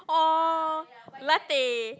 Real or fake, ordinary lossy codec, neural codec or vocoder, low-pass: real; none; none; none